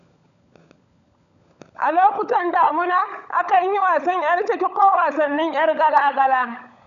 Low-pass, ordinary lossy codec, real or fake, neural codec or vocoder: 7.2 kHz; none; fake; codec, 16 kHz, 16 kbps, FunCodec, trained on LibriTTS, 50 frames a second